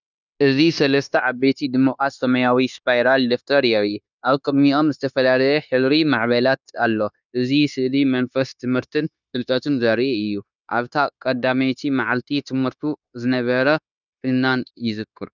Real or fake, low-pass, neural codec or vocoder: fake; 7.2 kHz; codec, 16 kHz, 0.9 kbps, LongCat-Audio-Codec